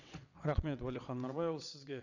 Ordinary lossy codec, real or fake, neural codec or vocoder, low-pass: none; fake; vocoder, 22.05 kHz, 80 mel bands, WaveNeXt; 7.2 kHz